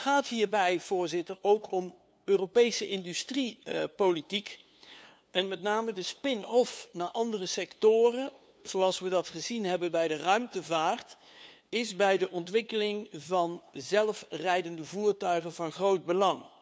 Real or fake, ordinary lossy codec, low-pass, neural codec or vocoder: fake; none; none; codec, 16 kHz, 2 kbps, FunCodec, trained on LibriTTS, 25 frames a second